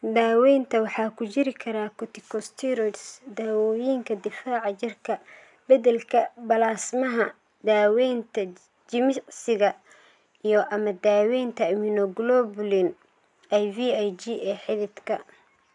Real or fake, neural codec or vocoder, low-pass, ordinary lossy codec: real; none; 10.8 kHz; none